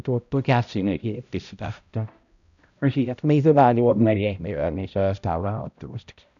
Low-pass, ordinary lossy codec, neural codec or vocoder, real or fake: 7.2 kHz; none; codec, 16 kHz, 0.5 kbps, X-Codec, HuBERT features, trained on balanced general audio; fake